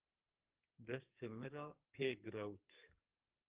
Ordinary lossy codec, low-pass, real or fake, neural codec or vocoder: Opus, 32 kbps; 3.6 kHz; fake; codec, 44.1 kHz, 2.6 kbps, SNAC